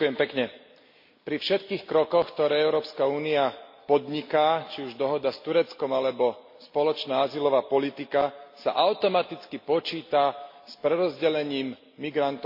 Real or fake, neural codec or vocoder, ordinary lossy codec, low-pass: real; none; MP3, 32 kbps; 5.4 kHz